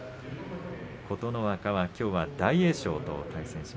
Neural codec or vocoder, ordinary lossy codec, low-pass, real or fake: none; none; none; real